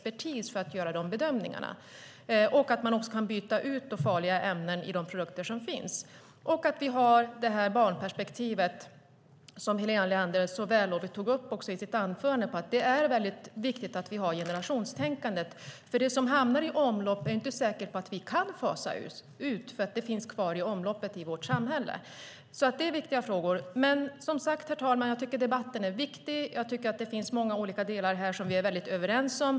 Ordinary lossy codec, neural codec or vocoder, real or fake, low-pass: none; none; real; none